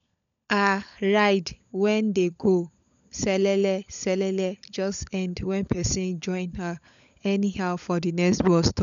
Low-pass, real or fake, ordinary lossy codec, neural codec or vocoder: 7.2 kHz; fake; none; codec, 16 kHz, 16 kbps, FunCodec, trained on LibriTTS, 50 frames a second